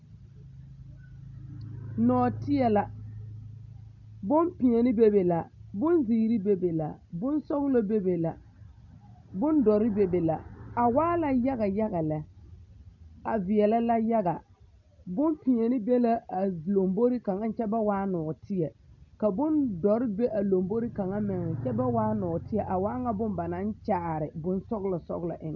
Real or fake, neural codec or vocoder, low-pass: real; none; 7.2 kHz